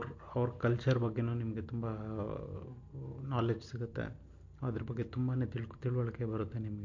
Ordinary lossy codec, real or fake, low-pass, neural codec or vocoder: MP3, 48 kbps; real; 7.2 kHz; none